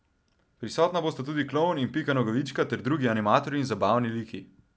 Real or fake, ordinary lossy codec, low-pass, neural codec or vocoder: real; none; none; none